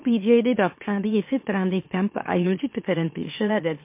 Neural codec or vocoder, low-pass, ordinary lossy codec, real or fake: autoencoder, 44.1 kHz, a latent of 192 numbers a frame, MeloTTS; 3.6 kHz; MP3, 24 kbps; fake